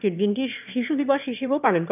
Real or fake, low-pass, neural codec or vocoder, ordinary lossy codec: fake; 3.6 kHz; autoencoder, 22.05 kHz, a latent of 192 numbers a frame, VITS, trained on one speaker; none